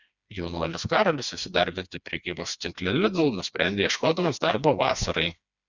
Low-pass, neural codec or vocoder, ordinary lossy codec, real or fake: 7.2 kHz; codec, 16 kHz, 2 kbps, FreqCodec, smaller model; Opus, 64 kbps; fake